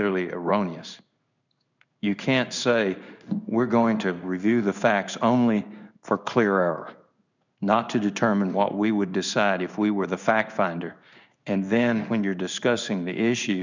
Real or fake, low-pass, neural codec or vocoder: fake; 7.2 kHz; codec, 16 kHz in and 24 kHz out, 1 kbps, XY-Tokenizer